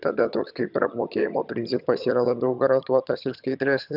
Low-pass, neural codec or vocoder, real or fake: 5.4 kHz; vocoder, 22.05 kHz, 80 mel bands, HiFi-GAN; fake